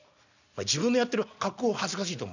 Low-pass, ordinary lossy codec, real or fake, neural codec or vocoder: 7.2 kHz; none; real; none